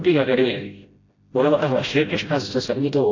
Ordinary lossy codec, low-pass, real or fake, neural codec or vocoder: AAC, 32 kbps; 7.2 kHz; fake; codec, 16 kHz, 0.5 kbps, FreqCodec, smaller model